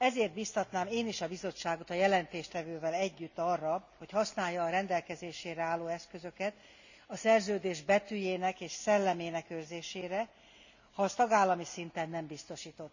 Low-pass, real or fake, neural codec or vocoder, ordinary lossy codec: 7.2 kHz; real; none; none